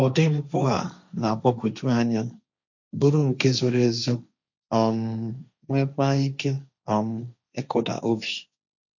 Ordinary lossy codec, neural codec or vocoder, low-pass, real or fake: none; codec, 16 kHz, 1.1 kbps, Voila-Tokenizer; 7.2 kHz; fake